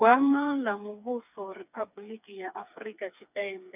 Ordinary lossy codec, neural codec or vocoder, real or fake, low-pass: none; codec, 16 kHz, 4 kbps, FreqCodec, smaller model; fake; 3.6 kHz